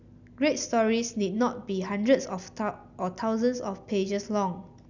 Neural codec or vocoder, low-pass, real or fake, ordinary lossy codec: none; 7.2 kHz; real; none